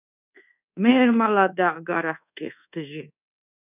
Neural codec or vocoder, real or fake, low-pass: codec, 24 kHz, 1.2 kbps, DualCodec; fake; 3.6 kHz